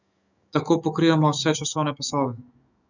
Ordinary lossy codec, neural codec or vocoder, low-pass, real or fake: none; autoencoder, 48 kHz, 128 numbers a frame, DAC-VAE, trained on Japanese speech; 7.2 kHz; fake